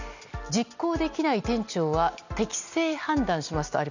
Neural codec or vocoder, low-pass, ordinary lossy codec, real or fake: none; 7.2 kHz; none; real